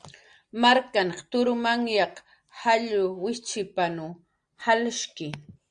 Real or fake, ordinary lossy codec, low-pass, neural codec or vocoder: real; Opus, 64 kbps; 9.9 kHz; none